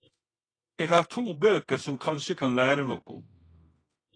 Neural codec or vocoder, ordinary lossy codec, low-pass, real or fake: codec, 24 kHz, 0.9 kbps, WavTokenizer, medium music audio release; AAC, 32 kbps; 9.9 kHz; fake